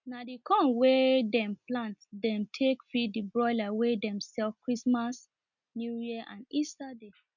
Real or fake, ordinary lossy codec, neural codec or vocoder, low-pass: real; none; none; 7.2 kHz